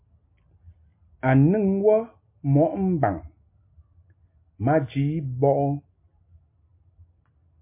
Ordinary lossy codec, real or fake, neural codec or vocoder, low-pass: MP3, 24 kbps; real; none; 3.6 kHz